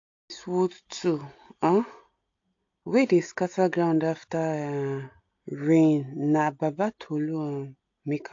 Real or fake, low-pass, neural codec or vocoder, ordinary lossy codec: real; 7.2 kHz; none; AAC, 48 kbps